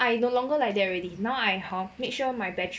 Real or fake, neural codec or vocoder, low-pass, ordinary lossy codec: real; none; none; none